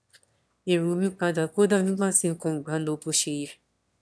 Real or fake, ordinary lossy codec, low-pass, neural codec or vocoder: fake; none; none; autoencoder, 22.05 kHz, a latent of 192 numbers a frame, VITS, trained on one speaker